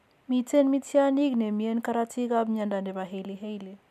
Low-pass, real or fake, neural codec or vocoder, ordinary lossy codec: 14.4 kHz; real; none; none